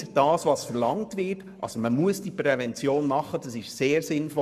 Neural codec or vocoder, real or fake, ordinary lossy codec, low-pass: codec, 44.1 kHz, 7.8 kbps, Pupu-Codec; fake; none; 14.4 kHz